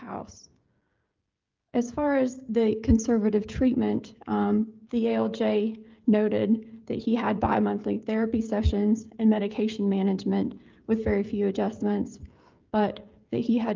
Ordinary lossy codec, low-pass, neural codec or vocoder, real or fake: Opus, 24 kbps; 7.2 kHz; codec, 16 kHz, 16 kbps, FreqCodec, smaller model; fake